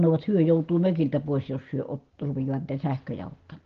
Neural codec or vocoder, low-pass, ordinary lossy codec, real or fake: none; 7.2 kHz; Opus, 16 kbps; real